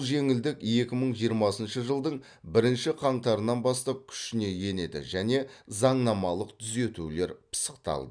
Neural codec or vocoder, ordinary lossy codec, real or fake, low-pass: none; none; real; 9.9 kHz